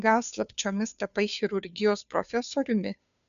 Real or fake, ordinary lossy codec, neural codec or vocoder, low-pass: fake; MP3, 96 kbps; codec, 16 kHz, 2 kbps, FunCodec, trained on Chinese and English, 25 frames a second; 7.2 kHz